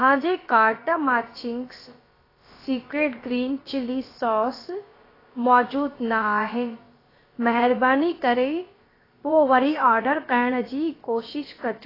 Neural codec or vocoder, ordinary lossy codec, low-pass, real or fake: codec, 16 kHz, about 1 kbps, DyCAST, with the encoder's durations; AAC, 24 kbps; 5.4 kHz; fake